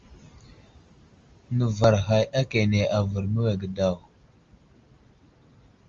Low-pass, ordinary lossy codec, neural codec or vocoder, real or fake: 7.2 kHz; Opus, 32 kbps; none; real